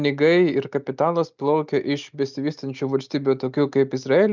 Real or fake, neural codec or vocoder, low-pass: real; none; 7.2 kHz